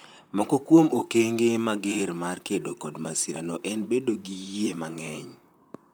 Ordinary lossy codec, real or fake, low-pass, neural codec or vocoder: none; fake; none; vocoder, 44.1 kHz, 128 mel bands, Pupu-Vocoder